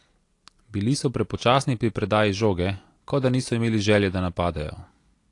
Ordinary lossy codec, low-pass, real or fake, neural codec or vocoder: AAC, 48 kbps; 10.8 kHz; real; none